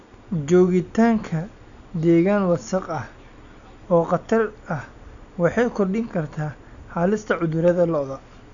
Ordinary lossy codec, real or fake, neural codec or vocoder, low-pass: none; real; none; 7.2 kHz